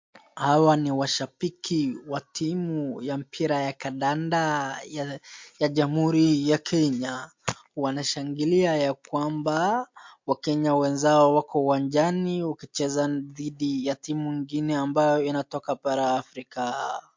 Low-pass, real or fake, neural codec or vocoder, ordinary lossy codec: 7.2 kHz; real; none; MP3, 48 kbps